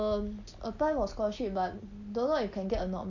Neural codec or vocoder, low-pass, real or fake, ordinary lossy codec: none; 7.2 kHz; real; none